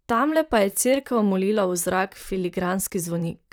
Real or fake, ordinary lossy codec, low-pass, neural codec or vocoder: fake; none; none; vocoder, 44.1 kHz, 128 mel bands, Pupu-Vocoder